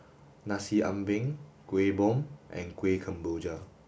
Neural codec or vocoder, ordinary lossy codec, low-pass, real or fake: none; none; none; real